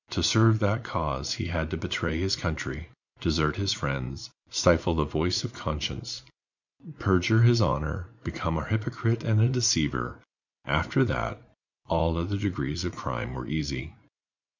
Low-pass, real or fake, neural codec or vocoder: 7.2 kHz; fake; vocoder, 44.1 kHz, 80 mel bands, Vocos